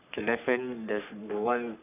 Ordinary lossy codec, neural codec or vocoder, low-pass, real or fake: none; codec, 44.1 kHz, 3.4 kbps, Pupu-Codec; 3.6 kHz; fake